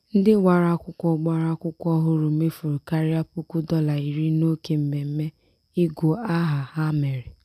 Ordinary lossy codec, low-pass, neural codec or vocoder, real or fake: none; 14.4 kHz; none; real